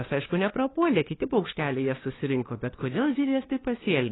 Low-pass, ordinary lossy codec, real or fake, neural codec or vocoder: 7.2 kHz; AAC, 16 kbps; fake; codec, 16 kHz, 2 kbps, FunCodec, trained on LibriTTS, 25 frames a second